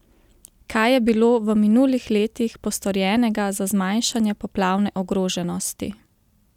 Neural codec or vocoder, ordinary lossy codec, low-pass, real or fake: none; none; 19.8 kHz; real